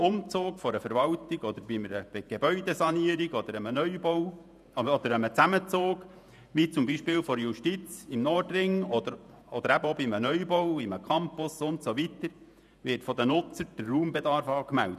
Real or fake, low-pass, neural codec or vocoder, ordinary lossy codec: fake; 14.4 kHz; vocoder, 48 kHz, 128 mel bands, Vocos; none